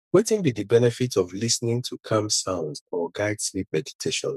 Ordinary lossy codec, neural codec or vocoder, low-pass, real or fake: none; codec, 44.1 kHz, 2.6 kbps, SNAC; 14.4 kHz; fake